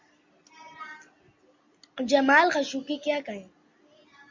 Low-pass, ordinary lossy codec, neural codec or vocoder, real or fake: 7.2 kHz; MP3, 48 kbps; none; real